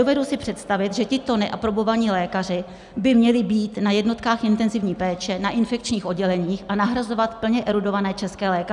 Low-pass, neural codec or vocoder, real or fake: 10.8 kHz; none; real